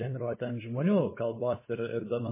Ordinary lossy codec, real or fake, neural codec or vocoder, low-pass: MP3, 16 kbps; fake; codec, 16 kHz, 8 kbps, FunCodec, trained on LibriTTS, 25 frames a second; 3.6 kHz